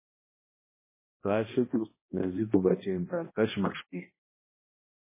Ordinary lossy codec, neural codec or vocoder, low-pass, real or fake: MP3, 16 kbps; codec, 16 kHz, 1 kbps, X-Codec, HuBERT features, trained on balanced general audio; 3.6 kHz; fake